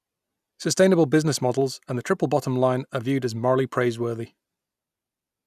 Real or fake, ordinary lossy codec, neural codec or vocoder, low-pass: real; none; none; 14.4 kHz